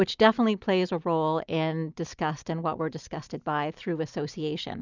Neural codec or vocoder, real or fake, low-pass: none; real; 7.2 kHz